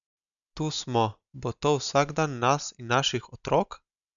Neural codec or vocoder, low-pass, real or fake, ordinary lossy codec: none; 7.2 kHz; real; none